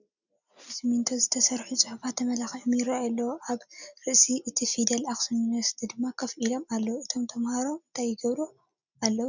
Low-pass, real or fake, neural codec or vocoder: 7.2 kHz; real; none